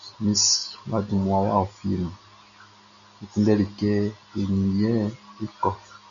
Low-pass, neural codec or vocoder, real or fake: 7.2 kHz; none; real